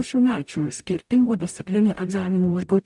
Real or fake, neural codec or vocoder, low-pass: fake; codec, 44.1 kHz, 0.9 kbps, DAC; 10.8 kHz